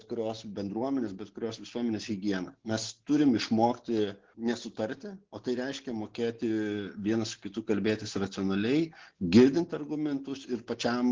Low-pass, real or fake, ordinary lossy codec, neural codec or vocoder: 7.2 kHz; real; Opus, 16 kbps; none